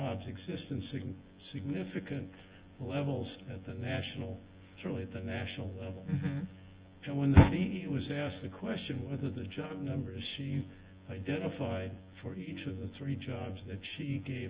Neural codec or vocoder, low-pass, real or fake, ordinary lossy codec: vocoder, 24 kHz, 100 mel bands, Vocos; 3.6 kHz; fake; Opus, 24 kbps